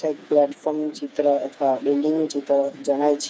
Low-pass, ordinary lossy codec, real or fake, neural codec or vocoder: none; none; fake; codec, 16 kHz, 4 kbps, FreqCodec, smaller model